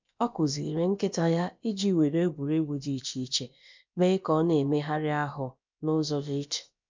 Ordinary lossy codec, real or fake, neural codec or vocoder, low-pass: MP3, 64 kbps; fake; codec, 16 kHz, about 1 kbps, DyCAST, with the encoder's durations; 7.2 kHz